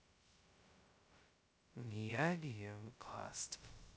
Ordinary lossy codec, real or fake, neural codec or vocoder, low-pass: none; fake; codec, 16 kHz, 0.2 kbps, FocalCodec; none